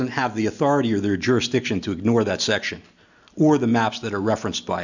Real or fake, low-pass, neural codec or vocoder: real; 7.2 kHz; none